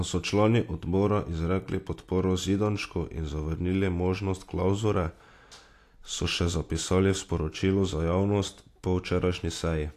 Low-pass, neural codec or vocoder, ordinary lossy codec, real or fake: 14.4 kHz; none; AAC, 64 kbps; real